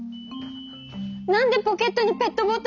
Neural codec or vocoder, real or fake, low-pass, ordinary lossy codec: none; real; 7.2 kHz; none